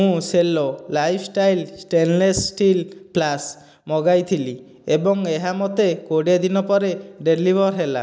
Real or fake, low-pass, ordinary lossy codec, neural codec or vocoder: real; none; none; none